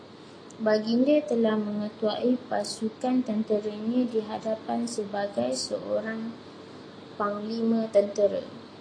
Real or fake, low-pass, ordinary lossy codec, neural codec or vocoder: real; 9.9 kHz; AAC, 32 kbps; none